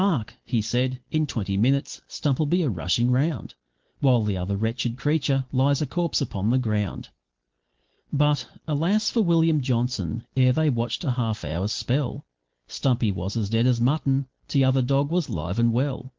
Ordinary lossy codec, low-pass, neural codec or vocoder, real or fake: Opus, 16 kbps; 7.2 kHz; none; real